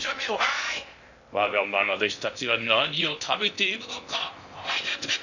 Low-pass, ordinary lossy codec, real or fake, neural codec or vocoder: 7.2 kHz; none; fake; codec, 16 kHz in and 24 kHz out, 0.6 kbps, FocalCodec, streaming, 2048 codes